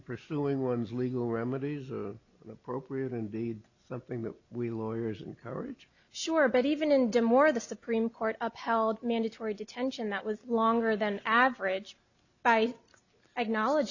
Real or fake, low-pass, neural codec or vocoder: real; 7.2 kHz; none